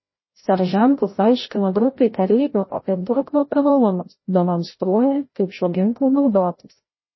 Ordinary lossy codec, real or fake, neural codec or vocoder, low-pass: MP3, 24 kbps; fake; codec, 16 kHz, 0.5 kbps, FreqCodec, larger model; 7.2 kHz